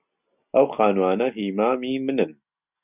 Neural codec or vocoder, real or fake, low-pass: none; real; 3.6 kHz